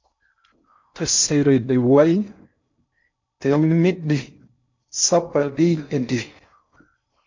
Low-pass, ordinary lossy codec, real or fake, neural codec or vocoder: 7.2 kHz; MP3, 48 kbps; fake; codec, 16 kHz in and 24 kHz out, 0.6 kbps, FocalCodec, streaming, 4096 codes